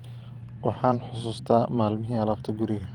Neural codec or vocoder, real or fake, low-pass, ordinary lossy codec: vocoder, 44.1 kHz, 128 mel bands every 512 samples, BigVGAN v2; fake; 19.8 kHz; Opus, 24 kbps